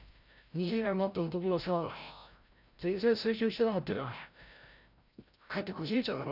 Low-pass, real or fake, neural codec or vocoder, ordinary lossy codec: 5.4 kHz; fake; codec, 16 kHz, 0.5 kbps, FreqCodec, larger model; none